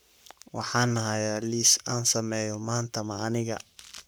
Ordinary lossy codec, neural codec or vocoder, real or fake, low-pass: none; codec, 44.1 kHz, 7.8 kbps, Pupu-Codec; fake; none